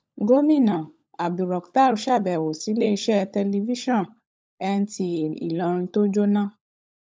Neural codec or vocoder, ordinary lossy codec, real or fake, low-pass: codec, 16 kHz, 16 kbps, FunCodec, trained on LibriTTS, 50 frames a second; none; fake; none